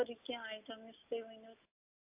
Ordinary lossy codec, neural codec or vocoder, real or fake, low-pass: AAC, 32 kbps; none; real; 3.6 kHz